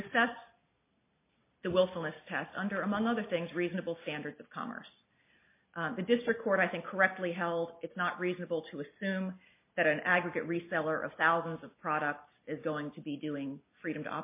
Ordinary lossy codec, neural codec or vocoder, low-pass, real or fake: MP3, 24 kbps; none; 3.6 kHz; real